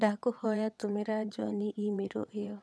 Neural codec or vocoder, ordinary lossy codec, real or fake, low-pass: vocoder, 22.05 kHz, 80 mel bands, WaveNeXt; none; fake; none